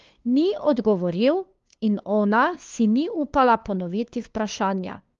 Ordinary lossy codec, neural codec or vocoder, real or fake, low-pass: Opus, 32 kbps; codec, 16 kHz, 2 kbps, FunCodec, trained on LibriTTS, 25 frames a second; fake; 7.2 kHz